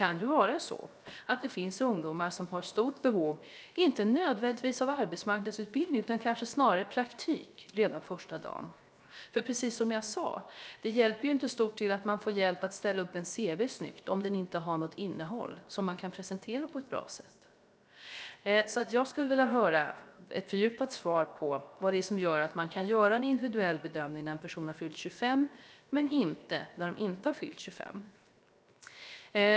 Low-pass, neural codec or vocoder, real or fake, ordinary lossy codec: none; codec, 16 kHz, 0.7 kbps, FocalCodec; fake; none